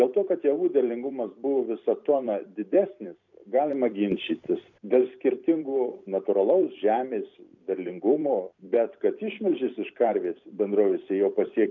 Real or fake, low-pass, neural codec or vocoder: fake; 7.2 kHz; vocoder, 44.1 kHz, 128 mel bands every 256 samples, BigVGAN v2